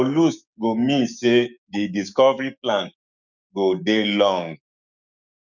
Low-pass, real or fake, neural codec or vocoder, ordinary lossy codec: 7.2 kHz; fake; codec, 16 kHz, 6 kbps, DAC; none